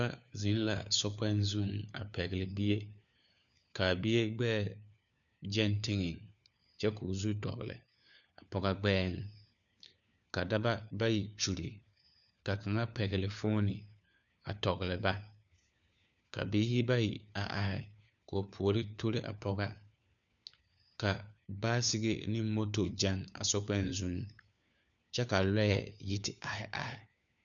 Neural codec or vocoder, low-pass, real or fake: codec, 16 kHz, 4 kbps, FunCodec, trained on LibriTTS, 50 frames a second; 7.2 kHz; fake